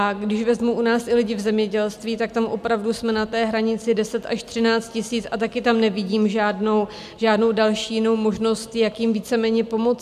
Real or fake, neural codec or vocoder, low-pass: real; none; 14.4 kHz